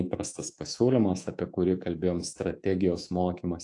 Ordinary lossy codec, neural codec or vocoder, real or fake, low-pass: AAC, 48 kbps; codec, 24 kHz, 3.1 kbps, DualCodec; fake; 10.8 kHz